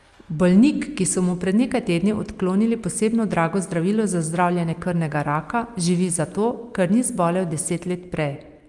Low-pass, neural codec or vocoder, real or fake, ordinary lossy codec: 10.8 kHz; none; real; Opus, 32 kbps